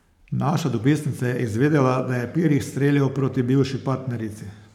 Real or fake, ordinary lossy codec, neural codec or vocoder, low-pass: fake; none; codec, 44.1 kHz, 7.8 kbps, Pupu-Codec; 19.8 kHz